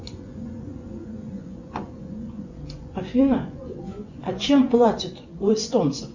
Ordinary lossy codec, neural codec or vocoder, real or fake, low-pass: Opus, 64 kbps; vocoder, 24 kHz, 100 mel bands, Vocos; fake; 7.2 kHz